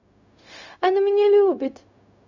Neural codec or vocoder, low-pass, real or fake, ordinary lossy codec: codec, 16 kHz, 0.4 kbps, LongCat-Audio-Codec; 7.2 kHz; fake; none